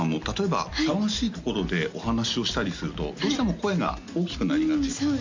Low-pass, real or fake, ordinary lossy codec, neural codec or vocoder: 7.2 kHz; real; MP3, 48 kbps; none